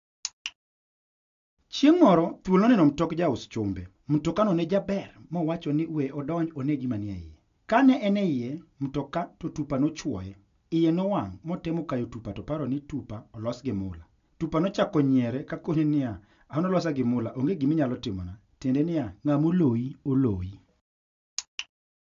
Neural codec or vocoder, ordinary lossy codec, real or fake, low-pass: none; AAC, 64 kbps; real; 7.2 kHz